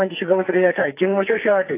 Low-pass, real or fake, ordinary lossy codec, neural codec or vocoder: 3.6 kHz; fake; AAC, 24 kbps; codec, 44.1 kHz, 2.6 kbps, SNAC